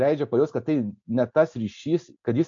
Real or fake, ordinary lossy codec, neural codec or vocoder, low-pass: real; MP3, 48 kbps; none; 7.2 kHz